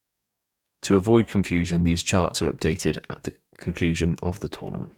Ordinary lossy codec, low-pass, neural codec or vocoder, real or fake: none; 19.8 kHz; codec, 44.1 kHz, 2.6 kbps, DAC; fake